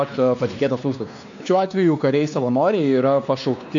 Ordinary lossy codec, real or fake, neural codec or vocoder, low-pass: AAC, 64 kbps; fake; codec, 16 kHz, 2 kbps, X-Codec, WavLM features, trained on Multilingual LibriSpeech; 7.2 kHz